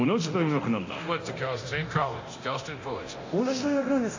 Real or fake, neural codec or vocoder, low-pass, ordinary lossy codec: fake; codec, 24 kHz, 0.9 kbps, DualCodec; 7.2 kHz; AAC, 48 kbps